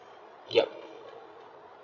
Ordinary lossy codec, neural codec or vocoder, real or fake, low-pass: none; codec, 16 kHz, 16 kbps, FreqCodec, larger model; fake; 7.2 kHz